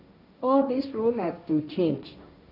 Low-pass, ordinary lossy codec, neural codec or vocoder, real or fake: 5.4 kHz; none; codec, 16 kHz, 1.1 kbps, Voila-Tokenizer; fake